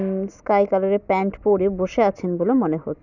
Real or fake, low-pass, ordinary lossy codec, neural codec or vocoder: real; 7.2 kHz; none; none